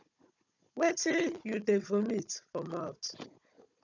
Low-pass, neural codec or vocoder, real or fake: 7.2 kHz; codec, 16 kHz, 16 kbps, FunCodec, trained on Chinese and English, 50 frames a second; fake